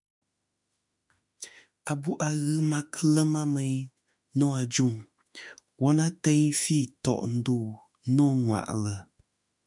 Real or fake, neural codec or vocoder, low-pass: fake; autoencoder, 48 kHz, 32 numbers a frame, DAC-VAE, trained on Japanese speech; 10.8 kHz